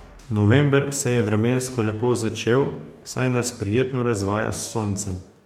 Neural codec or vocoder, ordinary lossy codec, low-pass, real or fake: codec, 44.1 kHz, 2.6 kbps, DAC; MP3, 96 kbps; 19.8 kHz; fake